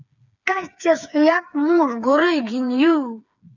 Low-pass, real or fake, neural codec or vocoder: 7.2 kHz; fake; codec, 16 kHz, 4 kbps, FreqCodec, smaller model